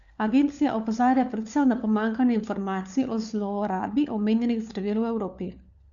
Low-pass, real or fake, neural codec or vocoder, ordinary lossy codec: 7.2 kHz; fake; codec, 16 kHz, 4 kbps, FunCodec, trained on LibriTTS, 50 frames a second; none